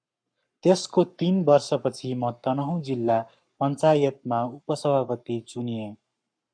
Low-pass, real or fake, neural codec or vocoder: 9.9 kHz; fake; codec, 44.1 kHz, 7.8 kbps, Pupu-Codec